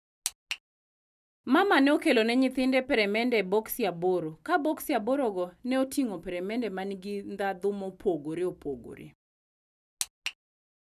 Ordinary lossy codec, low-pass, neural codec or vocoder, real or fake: none; 14.4 kHz; none; real